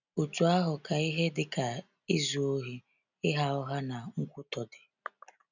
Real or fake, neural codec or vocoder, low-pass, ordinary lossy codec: real; none; 7.2 kHz; none